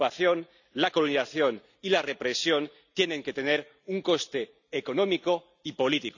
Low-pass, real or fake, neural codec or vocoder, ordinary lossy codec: 7.2 kHz; real; none; none